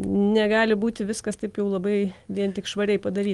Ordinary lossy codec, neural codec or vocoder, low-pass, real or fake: Opus, 24 kbps; none; 10.8 kHz; real